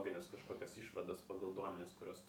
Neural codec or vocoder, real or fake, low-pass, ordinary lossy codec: codec, 44.1 kHz, 7.8 kbps, DAC; fake; 19.8 kHz; MP3, 96 kbps